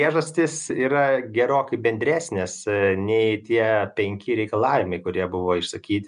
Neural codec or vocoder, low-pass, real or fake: none; 10.8 kHz; real